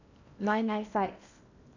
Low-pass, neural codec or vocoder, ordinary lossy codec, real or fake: 7.2 kHz; codec, 16 kHz in and 24 kHz out, 0.8 kbps, FocalCodec, streaming, 65536 codes; none; fake